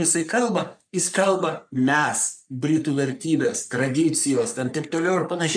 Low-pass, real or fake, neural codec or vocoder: 9.9 kHz; fake; codec, 44.1 kHz, 3.4 kbps, Pupu-Codec